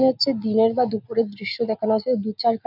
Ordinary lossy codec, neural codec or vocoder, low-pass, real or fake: none; none; 5.4 kHz; real